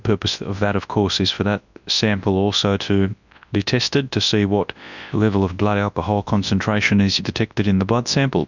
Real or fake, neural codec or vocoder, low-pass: fake; codec, 24 kHz, 0.9 kbps, WavTokenizer, large speech release; 7.2 kHz